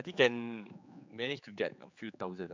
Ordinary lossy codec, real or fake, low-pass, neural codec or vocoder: MP3, 64 kbps; fake; 7.2 kHz; codec, 16 kHz, 4 kbps, X-Codec, HuBERT features, trained on general audio